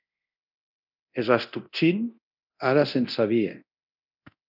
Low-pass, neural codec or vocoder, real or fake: 5.4 kHz; codec, 24 kHz, 0.9 kbps, DualCodec; fake